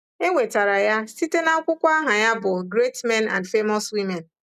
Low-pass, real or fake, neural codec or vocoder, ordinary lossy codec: 14.4 kHz; real; none; none